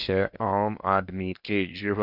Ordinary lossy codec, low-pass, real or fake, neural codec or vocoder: none; 5.4 kHz; fake; codec, 16 kHz in and 24 kHz out, 0.8 kbps, FocalCodec, streaming, 65536 codes